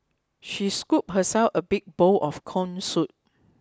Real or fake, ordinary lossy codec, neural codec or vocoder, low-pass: real; none; none; none